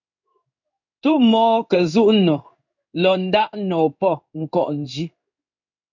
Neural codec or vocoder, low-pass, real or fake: codec, 16 kHz in and 24 kHz out, 1 kbps, XY-Tokenizer; 7.2 kHz; fake